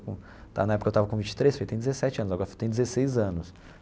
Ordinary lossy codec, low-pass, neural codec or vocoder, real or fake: none; none; none; real